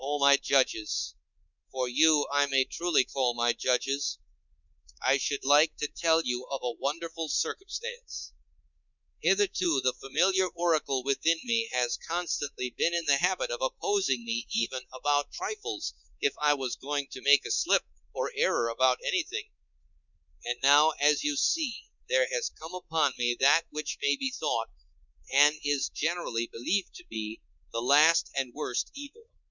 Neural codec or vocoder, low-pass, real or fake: codec, 24 kHz, 3.1 kbps, DualCodec; 7.2 kHz; fake